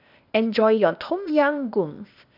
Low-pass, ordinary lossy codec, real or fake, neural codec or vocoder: 5.4 kHz; none; fake; codec, 16 kHz, 0.8 kbps, ZipCodec